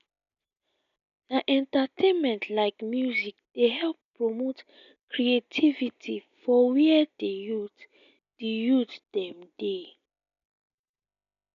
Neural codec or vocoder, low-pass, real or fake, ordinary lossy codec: none; 7.2 kHz; real; none